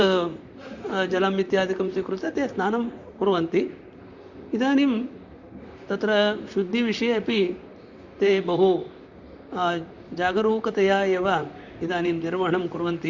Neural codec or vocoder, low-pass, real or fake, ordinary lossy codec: vocoder, 44.1 kHz, 128 mel bands, Pupu-Vocoder; 7.2 kHz; fake; Opus, 64 kbps